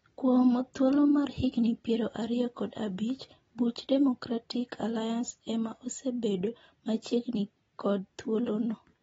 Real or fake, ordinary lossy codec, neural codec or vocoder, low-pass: real; AAC, 24 kbps; none; 19.8 kHz